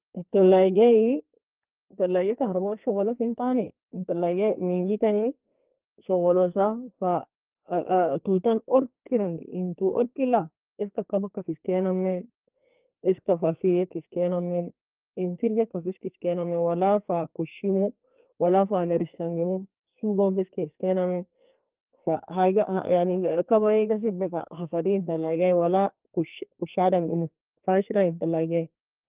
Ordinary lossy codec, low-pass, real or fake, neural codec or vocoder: Opus, 32 kbps; 3.6 kHz; fake; codec, 44.1 kHz, 2.6 kbps, SNAC